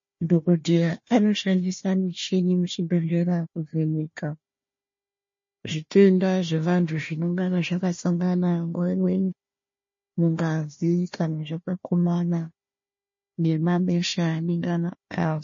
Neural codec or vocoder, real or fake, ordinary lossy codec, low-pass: codec, 16 kHz, 1 kbps, FunCodec, trained on Chinese and English, 50 frames a second; fake; MP3, 32 kbps; 7.2 kHz